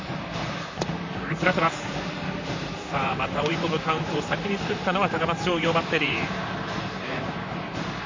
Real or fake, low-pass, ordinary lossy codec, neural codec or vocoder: fake; 7.2 kHz; MP3, 48 kbps; vocoder, 44.1 kHz, 128 mel bands, Pupu-Vocoder